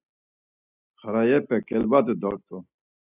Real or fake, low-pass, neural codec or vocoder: real; 3.6 kHz; none